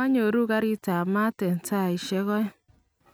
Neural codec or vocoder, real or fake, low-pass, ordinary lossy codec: none; real; none; none